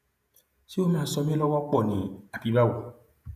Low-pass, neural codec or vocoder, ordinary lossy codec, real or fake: 14.4 kHz; vocoder, 48 kHz, 128 mel bands, Vocos; none; fake